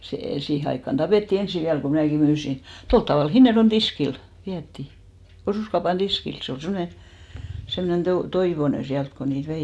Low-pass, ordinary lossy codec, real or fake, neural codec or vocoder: none; none; real; none